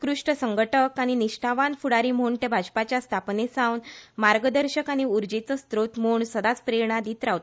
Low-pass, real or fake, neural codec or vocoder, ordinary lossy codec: none; real; none; none